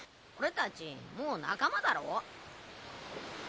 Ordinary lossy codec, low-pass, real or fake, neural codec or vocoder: none; none; real; none